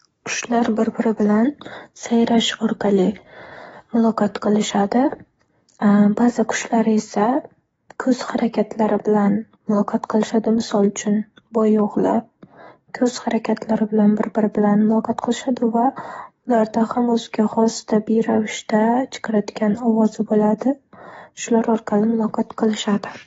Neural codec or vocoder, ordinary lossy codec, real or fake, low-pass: codec, 44.1 kHz, 7.8 kbps, DAC; AAC, 24 kbps; fake; 19.8 kHz